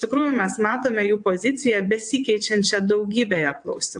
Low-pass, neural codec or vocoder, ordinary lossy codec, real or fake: 9.9 kHz; vocoder, 22.05 kHz, 80 mel bands, WaveNeXt; AAC, 64 kbps; fake